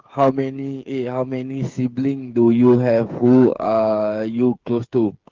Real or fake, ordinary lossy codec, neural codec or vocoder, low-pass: fake; Opus, 32 kbps; codec, 16 kHz, 8 kbps, FreqCodec, smaller model; 7.2 kHz